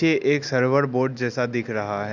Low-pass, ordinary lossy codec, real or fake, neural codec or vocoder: 7.2 kHz; none; real; none